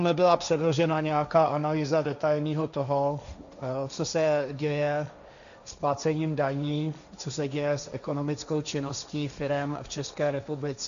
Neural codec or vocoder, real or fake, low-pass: codec, 16 kHz, 1.1 kbps, Voila-Tokenizer; fake; 7.2 kHz